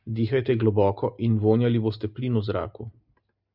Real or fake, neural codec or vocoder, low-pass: real; none; 5.4 kHz